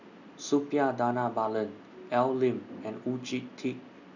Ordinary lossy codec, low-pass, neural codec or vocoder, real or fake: none; 7.2 kHz; none; real